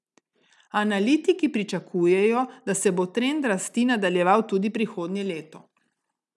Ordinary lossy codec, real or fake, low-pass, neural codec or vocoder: none; real; none; none